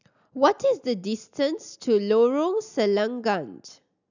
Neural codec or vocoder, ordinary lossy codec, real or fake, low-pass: none; none; real; 7.2 kHz